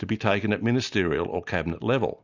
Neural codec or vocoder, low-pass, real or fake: none; 7.2 kHz; real